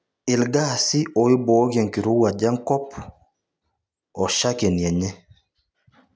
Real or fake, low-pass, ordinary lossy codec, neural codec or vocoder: real; none; none; none